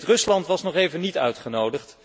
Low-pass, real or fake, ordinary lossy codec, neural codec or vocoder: none; real; none; none